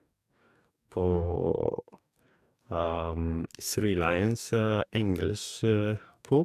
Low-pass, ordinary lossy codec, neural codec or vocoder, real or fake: 14.4 kHz; none; codec, 44.1 kHz, 2.6 kbps, DAC; fake